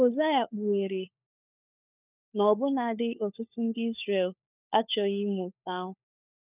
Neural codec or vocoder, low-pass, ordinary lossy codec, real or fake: codec, 16 kHz, 4 kbps, FunCodec, trained on LibriTTS, 50 frames a second; 3.6 kHz; none; fake